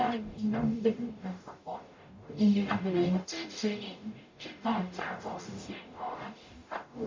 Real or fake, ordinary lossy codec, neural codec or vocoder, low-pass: fake; MP3, 64 kbps; codec, 44.1 kHz, 0.9 kbps, DAC; 7.2 kHz